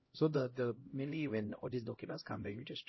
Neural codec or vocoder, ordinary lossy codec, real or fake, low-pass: codec, 16 kHz, 0.5 kbps, X-Codec, HuBERT features, trained on LibriSpeech; MP3, 24 kbps; fake; 7.2 kHz